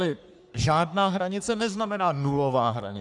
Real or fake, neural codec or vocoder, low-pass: fake; codec, 44.1 kHz, 3.4 kbps, Pupu-Codec; 10.8 kHz